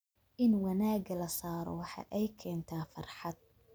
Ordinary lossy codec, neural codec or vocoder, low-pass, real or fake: none; none; none; real